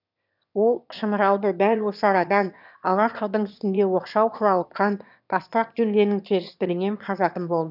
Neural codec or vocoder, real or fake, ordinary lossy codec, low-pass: autoencoder, 22.05 kHz, a latent of 192 numbers a frame, VITS, trained on one speaker; fake; none; 5.4 kHz